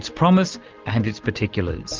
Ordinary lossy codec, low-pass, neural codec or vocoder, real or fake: Opus, 32 kbps; 7.2 kHz; none; real